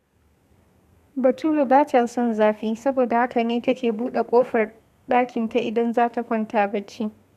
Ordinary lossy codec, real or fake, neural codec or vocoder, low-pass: none; fake; codec, 32 kHz, 1.9 kbps, SNAC; 14.4 kHz